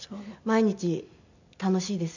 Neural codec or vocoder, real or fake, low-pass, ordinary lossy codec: none; real; 7.2 kHz; none